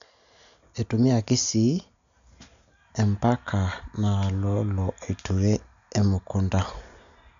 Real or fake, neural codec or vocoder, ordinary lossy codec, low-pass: real; none; none; 7.2 kHz